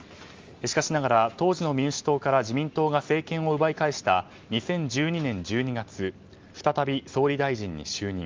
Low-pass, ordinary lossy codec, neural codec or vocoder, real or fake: 7.2 kHz; Opus, 32 kbps; none; real